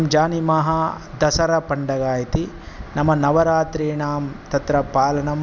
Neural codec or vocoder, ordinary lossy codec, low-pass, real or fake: none; none; 7.2 kHz; real